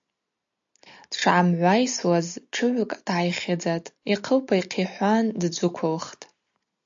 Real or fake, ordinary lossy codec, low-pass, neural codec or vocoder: real; AAC, 64 kbps; 7.2 kHz; none